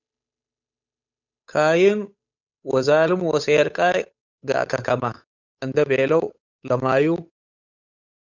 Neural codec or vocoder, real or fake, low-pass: codec, 16 kHz, 8 kbps, FunCodec, trained on Chinese and English, 25 frames a second; fake; 7.2 kHz